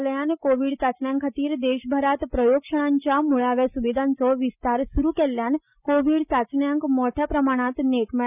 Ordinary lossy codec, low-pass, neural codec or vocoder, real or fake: none; 3.6 kHz; none; real